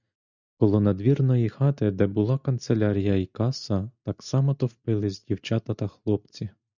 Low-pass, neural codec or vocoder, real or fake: 7.2 kHz; none; real